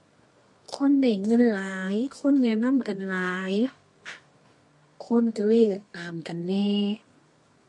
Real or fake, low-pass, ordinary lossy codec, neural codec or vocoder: fake; 10.8 kHz; MP3, 48 kbps; codec, 24 kHz, 0.9 kbps, WavTokenizer, medium music audio release